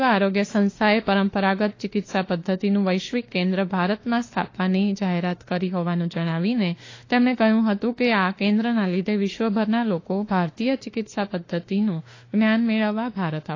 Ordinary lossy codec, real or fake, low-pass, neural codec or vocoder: AAC, 32 kbps; fake; 7.2 kHz; autoencoder, 48 kHz, 32 numbers a frame, DAC-VAE, trained on Japanese speech